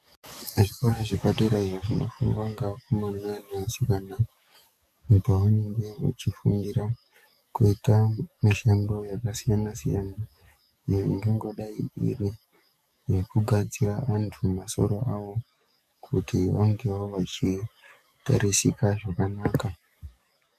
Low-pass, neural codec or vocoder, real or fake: 14.4 kHz; codec, 44.1 kHz, 7.8 kbps, DAC; fake